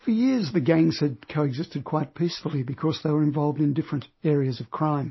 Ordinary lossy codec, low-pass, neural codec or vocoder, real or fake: MP3, 24 kbps; 7.2 kHz; none; real